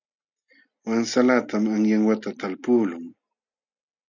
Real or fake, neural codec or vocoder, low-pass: real; none; 7.2 kHz